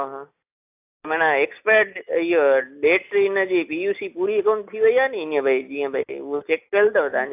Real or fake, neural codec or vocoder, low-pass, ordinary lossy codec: real; none; 3.6 kHz; AAC, 32 kbps